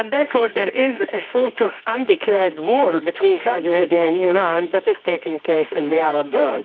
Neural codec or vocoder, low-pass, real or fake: codec, 24 kHz, 0.9 kbps, WavTokenizer, medium music audio release; 7.2 kHz; fake